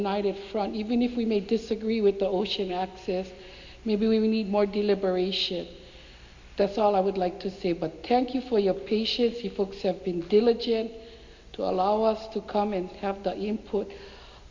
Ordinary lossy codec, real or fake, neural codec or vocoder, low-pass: MP3, 48 kbps; real; none; 7.2 kHz